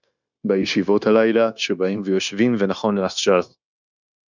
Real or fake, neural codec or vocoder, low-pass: fake; codec, 16 kHz, 0.9 kbps, LongCat-Audio-Codec; 7.2 kHz